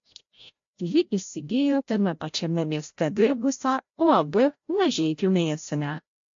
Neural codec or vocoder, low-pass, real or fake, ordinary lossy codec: codec, 16 kHz, 0.5 kbps, FreqCodec, larger model; 7.2 kHz; fake; MP3, 48 kbps